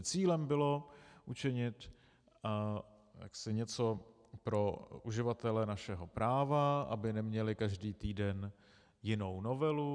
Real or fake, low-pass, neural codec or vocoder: real; 9.9 kHz; none